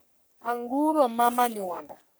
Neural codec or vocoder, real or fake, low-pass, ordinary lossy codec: codec, 44.1 kHz, 3.4 kbps, Pupu-Codec; fake; none; none